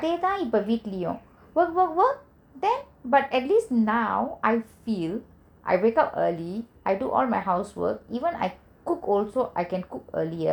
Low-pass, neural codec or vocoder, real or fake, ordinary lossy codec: 19.8 kHz; none; real; none